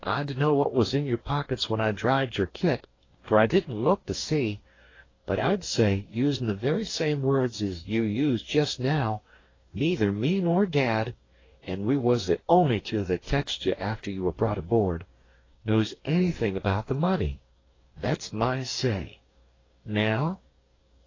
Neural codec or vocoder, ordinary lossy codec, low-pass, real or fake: codec, 44.1 kHz, 2.6 kbps, DAC; AAC, 32 kbps; 7.2 kHz; fake